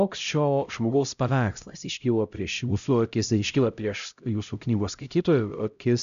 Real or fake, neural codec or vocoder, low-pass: fake; codec, 16 kHz, 0.5 kbps, X-Codec, HuBERT features, trained on LibriSpeech; 7.2 kHz